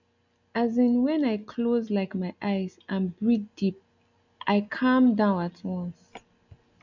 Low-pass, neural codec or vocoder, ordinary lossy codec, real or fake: 7.2 kHz; none; none; real